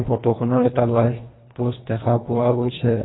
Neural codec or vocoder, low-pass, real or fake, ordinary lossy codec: codec, 24 kHz, 1.5 kbps, HILCodec; 7.2 kHz; fake; AAC, 16 kbps